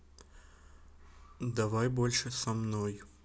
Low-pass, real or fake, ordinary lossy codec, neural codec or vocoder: none; real; none; none